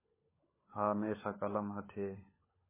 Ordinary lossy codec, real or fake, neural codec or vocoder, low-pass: MP3, 16 kbps; fake; codec, 16 kHz, 16 kbps, FunCodec, trained on LibriTTS, 50 frames a second; 3.6 kHz